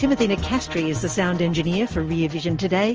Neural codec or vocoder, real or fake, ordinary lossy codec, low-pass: none; real; Opus, 24 kbps; 7.2 kHz